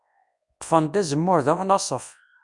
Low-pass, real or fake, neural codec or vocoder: 10.8 kHz; fake; codec, 24 kHz, 0.9 kbps, WavTokenizer, large speech release